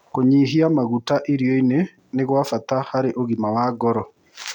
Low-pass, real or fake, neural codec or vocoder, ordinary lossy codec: 19.8 kHz; real; none; none